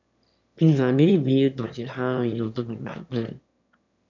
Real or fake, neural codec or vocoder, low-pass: fake; autoencoder, 22.05 kHz, a latent of 192 numbers a frame, VITS, trained on one speaker; 7.2 kHz